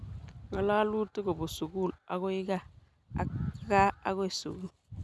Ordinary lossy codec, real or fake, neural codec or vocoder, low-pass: none; real; none; none